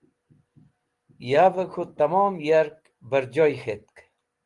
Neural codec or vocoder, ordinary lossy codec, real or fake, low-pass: none; Opus, 24 kbps; real; 10.8 kHz